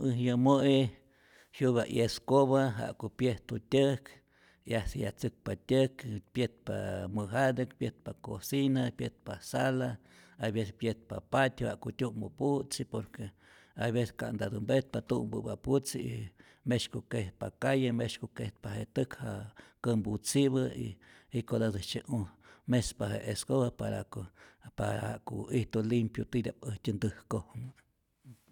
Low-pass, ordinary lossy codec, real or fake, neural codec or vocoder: 19.8 kHz; none; real; none